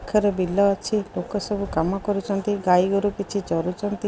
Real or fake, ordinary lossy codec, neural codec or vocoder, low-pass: real; none; none; none